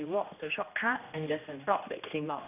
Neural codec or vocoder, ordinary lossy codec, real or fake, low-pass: codec, 16 kHz, 1 kbps, X-Codec, HuBERT features, trained on general audio; none; fake; 3.6 kHz